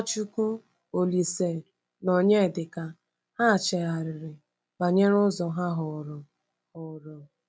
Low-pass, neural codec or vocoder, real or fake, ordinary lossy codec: none; none; real; none